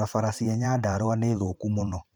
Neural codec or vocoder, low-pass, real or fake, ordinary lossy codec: vocoder, 44.1 kHz, 128 mel bands every 512 samples, BigVGAN v2; none; fake; none